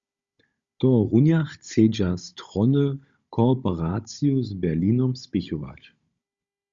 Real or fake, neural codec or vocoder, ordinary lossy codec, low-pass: fake; codec, 16 kHz, 16 kbps, FunCodec, trained on Chinese and English, 50 frames a second; Opus, 64 kbps; 7.2 kHz